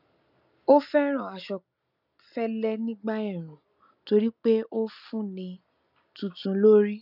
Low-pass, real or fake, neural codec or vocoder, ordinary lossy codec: 5.4 kHz; real; none; none